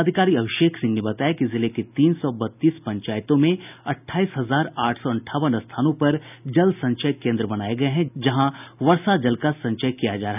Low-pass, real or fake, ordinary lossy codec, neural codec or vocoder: 3.6 kHz; real; none; none